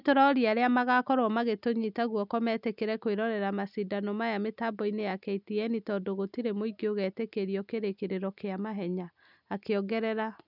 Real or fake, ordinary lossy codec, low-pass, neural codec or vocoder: real; none; 5.4 kHz; none